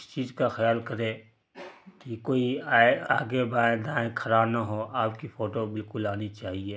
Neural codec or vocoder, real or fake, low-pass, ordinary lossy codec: none; real; none; none